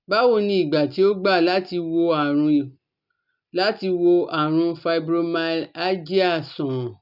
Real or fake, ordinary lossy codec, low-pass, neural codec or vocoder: real; none; 5.4 kHz; none